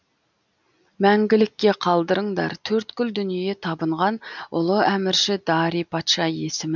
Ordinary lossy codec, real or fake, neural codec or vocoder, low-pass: none; real; none; 7.2 kHz